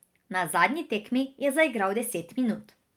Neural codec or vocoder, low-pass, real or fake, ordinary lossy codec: none; 19.8 kHz; real; Opus, 32 kbps